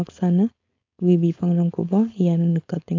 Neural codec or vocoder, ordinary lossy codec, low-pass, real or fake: codec, 16 kHz, 4.8 kbps, FACodec; AAC, 48 kbps; 7.2 kHz; fake